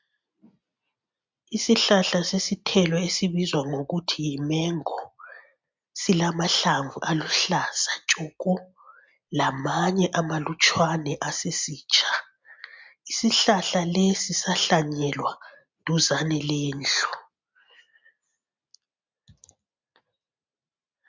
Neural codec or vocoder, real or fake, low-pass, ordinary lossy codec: none; real; 7.2 kHz; MP3, 64 kbps